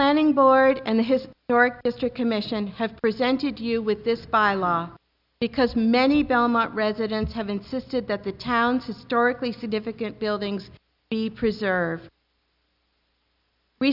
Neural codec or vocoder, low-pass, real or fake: none; 5.4 kHz; real